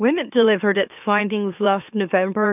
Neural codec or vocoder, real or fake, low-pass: autoencoder, 44.1 kHz, a latent of 192 numbers a frame, MeloTTS; fake; 3.6 kHz